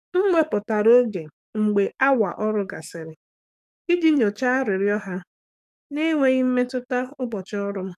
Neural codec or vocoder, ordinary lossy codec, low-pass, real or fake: codec, 44.1 kHz, 7.8 kbps, DAC; none; 14.4 kHz; fake